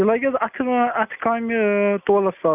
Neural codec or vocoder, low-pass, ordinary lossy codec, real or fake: none; 3.6 kHz; none; real